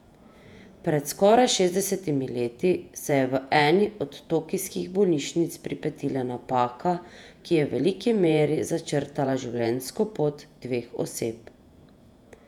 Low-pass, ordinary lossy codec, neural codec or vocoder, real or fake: 19.8 kHz; none; vocoder, 48 kHz, 128 mel bands, Vocos; fake